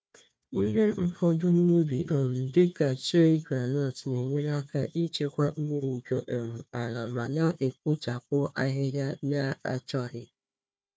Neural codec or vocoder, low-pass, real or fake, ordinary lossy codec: codec, 16 kHz, 1 kbps, FunCodec, trained on Chinese and English, 50 frames a second; none; fake; none